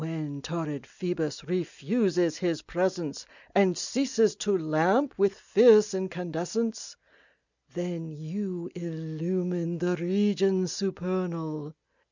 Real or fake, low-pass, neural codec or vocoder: real; 7.2 kHz; none